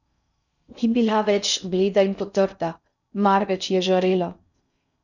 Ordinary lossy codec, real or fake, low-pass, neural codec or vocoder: none; fake; 7.2 kHz; codec, 16 kHz in and 24 kHz out, 0.6 kbps, FocalCodec, streaming, 4096 codes